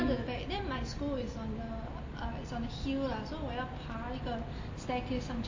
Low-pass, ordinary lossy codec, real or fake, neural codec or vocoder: 7.2 kHz; MP3, 32 kbps; real; none